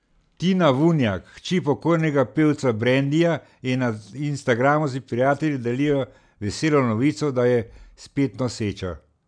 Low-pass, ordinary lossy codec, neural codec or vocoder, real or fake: 9.9 kHz; none; none; real